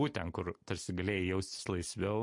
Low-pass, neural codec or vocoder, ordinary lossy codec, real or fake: 10.8 kHz; none; MP3, 48 kbps; real